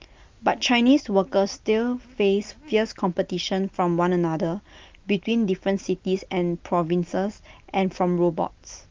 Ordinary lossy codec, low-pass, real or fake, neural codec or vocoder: Opus, 32 kbps; 7.2 kHz; real; none